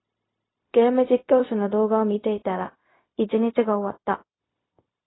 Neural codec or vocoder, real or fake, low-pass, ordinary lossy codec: codec, 16 kHz, 0.4 kbps, LongCat-Audio-Codec; fake; 7.2 kHz; AAC, 16 kbps